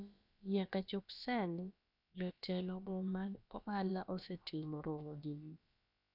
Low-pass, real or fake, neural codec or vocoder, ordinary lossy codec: 5.4 kHz; fake; codec, 16 kHz, about 1 kbps, DyCAST, with the encoder's durations; none